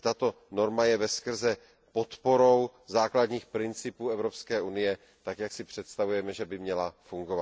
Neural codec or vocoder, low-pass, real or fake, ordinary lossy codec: none; none; real; none